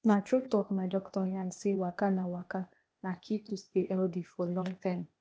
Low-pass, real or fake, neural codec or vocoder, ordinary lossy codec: none; fake; codec, 16 kHz, 0.8 kbps, ZipCodec; none